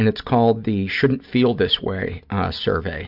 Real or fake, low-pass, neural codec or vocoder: real; 5.4 kHz; none